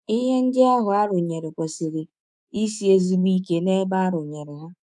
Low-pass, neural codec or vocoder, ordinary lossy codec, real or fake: 10.8 kHz; codec, 24 kHz, 3.1 kbps, DualCodec; none; fake